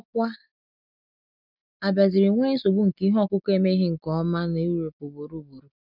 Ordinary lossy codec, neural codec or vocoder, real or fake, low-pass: none; none; real; 5.4 kHz